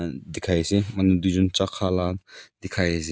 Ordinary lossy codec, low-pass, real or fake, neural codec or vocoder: none; none; real; none